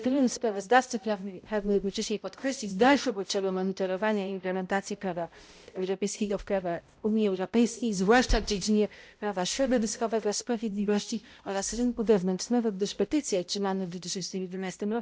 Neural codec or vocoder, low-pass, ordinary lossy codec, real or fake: codec, 16 kHz, 0.5 kbps, X-Codec, HuBERT features, trained on balanced general audio; none; none; fake